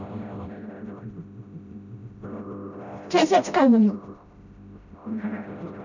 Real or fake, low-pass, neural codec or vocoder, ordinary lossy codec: fake; 7.2 kHz; codec, 16 kHz, 0.5 kbps, FreqCodec, smaller model; none